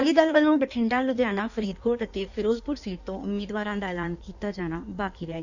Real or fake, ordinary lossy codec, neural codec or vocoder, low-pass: fake; none; codec, 16 kHz in and 24 kHz out, 1.1 kbps, FireRedTTS-2 codec; 7.2 kHz